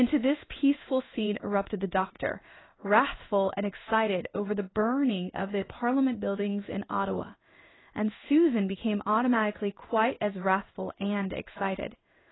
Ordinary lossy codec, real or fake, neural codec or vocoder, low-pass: AAC, 16 kbps; fake; codec, 16 kHz in and 24 kHz out, 1 kbps, XY-Tokenizer; 7.2 kHz